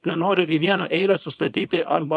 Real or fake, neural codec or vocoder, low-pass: fake; codec, 24 kHz, 0.9 kbps, WavTokenizer, small release; 10.8 kHz